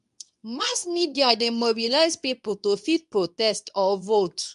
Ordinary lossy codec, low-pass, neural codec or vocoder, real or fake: none; 10.8 kHz; codec, 24 kHz, 0.9 kbps, WavTokenizer, medium speech release version 2; fake